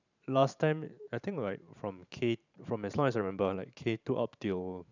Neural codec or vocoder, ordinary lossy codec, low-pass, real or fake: vocoder, 44.1 kHz, 128 mel bands every 512 samples, BigVGAN v2; none; 7.2 kHz; fake